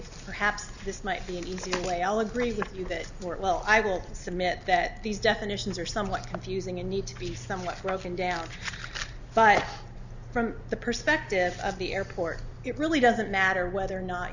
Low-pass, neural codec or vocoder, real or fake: 7.2 kHz; none; real